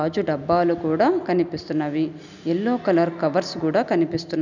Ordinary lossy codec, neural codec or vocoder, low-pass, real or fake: none; none; 7.2 kHz; real